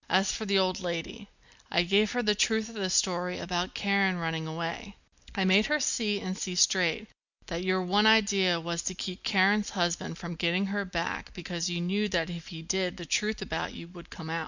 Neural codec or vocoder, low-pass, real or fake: none; 7.2 kHz; real